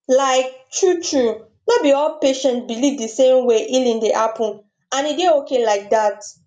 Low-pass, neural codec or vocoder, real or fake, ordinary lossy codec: 9.9 kHz; none; real; none